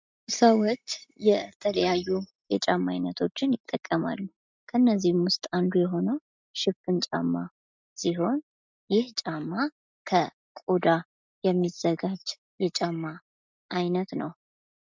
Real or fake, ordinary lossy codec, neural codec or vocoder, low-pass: real; MP3, 64 kbps; none; 7.2 kHz